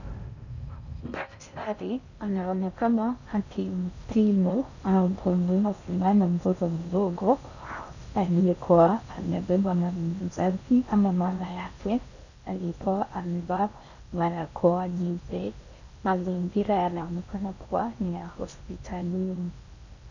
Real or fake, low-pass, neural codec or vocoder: fake; 7.2 kHz; codec, 16 kHz in and 24 kHz out, 0.6 kbps, FocalCodec, streaming, 4096 codes